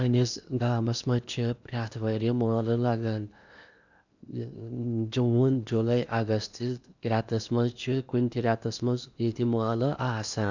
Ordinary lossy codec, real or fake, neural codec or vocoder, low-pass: none; fake; codec, 16 kHz in and 24 kHz out, 0.8 kbps, FocalCodec, streaming, 65536 codes; 7.2 kHz